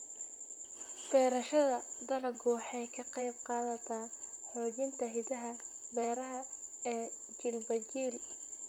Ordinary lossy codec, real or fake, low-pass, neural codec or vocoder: none; fake; 19.8 kHz; vocoder, 44.1 kHz, 128 mel bands, Pupu-Vocoder